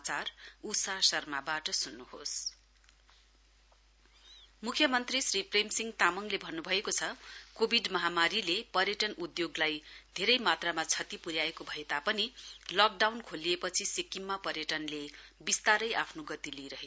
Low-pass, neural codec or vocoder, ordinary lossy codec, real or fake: none; none; none; real